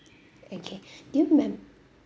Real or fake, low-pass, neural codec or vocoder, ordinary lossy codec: real; none; none; none